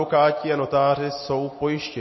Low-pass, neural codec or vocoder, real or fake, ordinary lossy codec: 7.2 kHz; none; real; MP3, 24 kbps